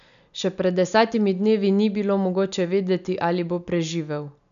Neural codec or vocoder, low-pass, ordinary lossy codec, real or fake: none; 7.2 kHz; none; real